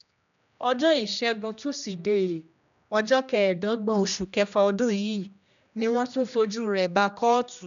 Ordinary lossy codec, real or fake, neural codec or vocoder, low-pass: none; fake; codec, 16 kHz, 1 kbps, X-Codec, HuBERT features, trained on general audio; 7.2 kHz